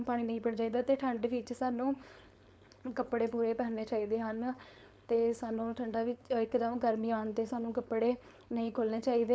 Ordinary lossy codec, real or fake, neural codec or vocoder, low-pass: none; fake; codec, 16 kHz, 4.8 kbps, FACodec; none